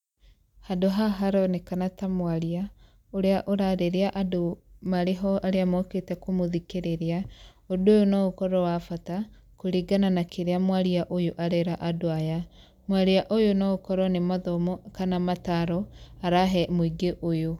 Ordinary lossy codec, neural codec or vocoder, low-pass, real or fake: none; none; 19.8 kHz; real